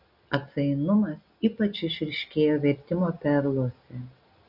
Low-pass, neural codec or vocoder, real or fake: 5.4 kHz; none; real